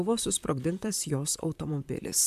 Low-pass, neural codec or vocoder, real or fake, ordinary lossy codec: 14.4 kHz; vocoder, 44.1 kHz, 128 mel bands, Pupu-Vocoder; fake; AAC, 96 kbps